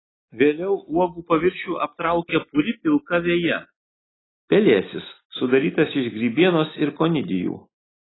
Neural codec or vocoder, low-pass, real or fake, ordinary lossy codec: none; 7.2 kHz; real; AAC, 16 kbps